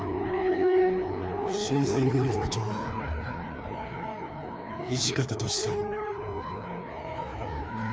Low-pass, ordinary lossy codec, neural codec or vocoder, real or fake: none; none; codec, 16 kHz, 2 kbps, FreqCodec, larger model; fake